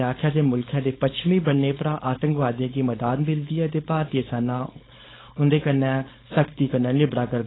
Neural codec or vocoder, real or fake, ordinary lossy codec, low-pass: codec, 16 kHz, 4.8 kbps, FACodec; fake; AAC, 16 kbps; 7.2 kHz